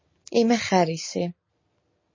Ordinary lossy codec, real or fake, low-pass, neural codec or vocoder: MP3, 32 kbps; real; 7.2 kHz; none